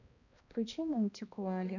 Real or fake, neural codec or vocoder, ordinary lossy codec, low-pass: fake; codec, 16 kHz, 1 kbps, X-Codec, HuBERT features, trained on balanced general audio; none; 7.2 kHz